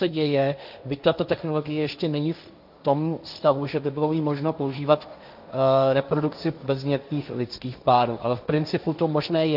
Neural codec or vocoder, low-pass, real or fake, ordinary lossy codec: codec, 16 kHz, 1.1 kbps, Voila-Tokenizer; 5.4 kHz; fake; AAC, 48 kbps